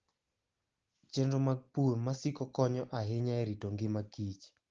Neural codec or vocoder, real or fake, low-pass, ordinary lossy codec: none; real; 7.2 kHz; Opus, 16 kbps